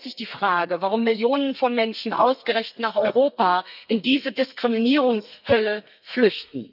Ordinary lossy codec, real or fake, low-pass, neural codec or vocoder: none; fake; 5.4 kHz; codec, 32 kHz, 1.9 kbps, SNAC